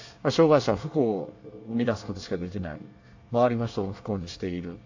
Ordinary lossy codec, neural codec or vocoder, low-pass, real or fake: AAC, 48 kbps; codec, 24 kHz, 1 kbps, SNAC; 7.2 kHz; fake